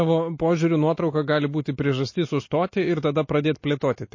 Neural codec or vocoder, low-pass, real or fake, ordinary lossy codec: none; 7.2 kHz; real; MP3, 32 kbps